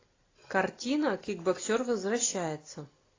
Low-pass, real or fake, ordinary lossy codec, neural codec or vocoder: 7.2 kHz; real; AAC, 32 kbps; none